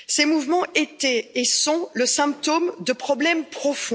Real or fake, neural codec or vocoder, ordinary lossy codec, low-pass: real; none; none; none